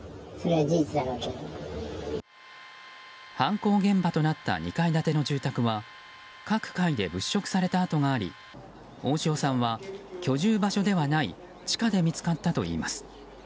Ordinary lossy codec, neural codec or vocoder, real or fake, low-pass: none; none; real; none